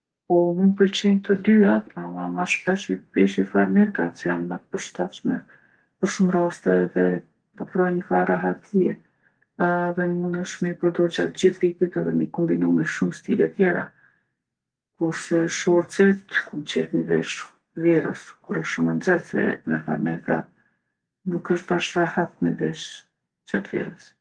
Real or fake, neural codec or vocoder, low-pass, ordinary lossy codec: fake; codec, 32 kHz, 1.9 kbps, SNAC; 9.9 kHz; Opus, 24 kbps